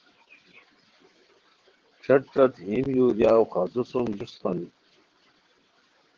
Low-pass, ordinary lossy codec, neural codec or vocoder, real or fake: 7.2 kHz; Opus, 16 kbps; vocoder, 22.05 kHz, 80 mel bands, WaveNeXt; fake